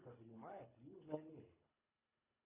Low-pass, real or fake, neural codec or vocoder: 3.6 kHz; fake; codec, 24 kHz, 3 kbps, HILCodec